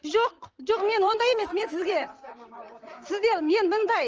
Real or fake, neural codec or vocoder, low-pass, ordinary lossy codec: fake; vocoder, 44.1 kHz, 128 mel bands, Pupu-Vocoder; 7.2 kHz; Opus, 24 kbps